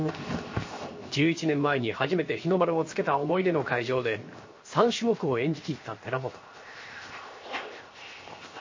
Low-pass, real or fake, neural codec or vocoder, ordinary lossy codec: 7.2 kHz; fake; codec, 16 kHz, 0.7 kbps, FocalCodec; MP3, 32 kbps